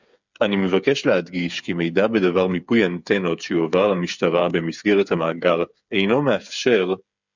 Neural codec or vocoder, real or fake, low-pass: codec, 16 kHz, 8 kbps, FreqCodec, smaller model; fake; 7.2 kHz